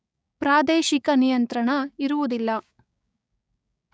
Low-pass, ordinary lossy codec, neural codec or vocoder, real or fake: none; none; codec, 16 kHz, 6 kbps, DAC; fake